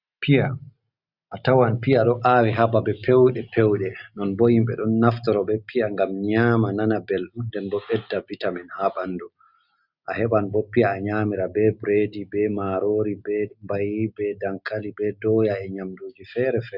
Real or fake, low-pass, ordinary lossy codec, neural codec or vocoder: real; 5.4 kHz; Opus, 64 kbps; none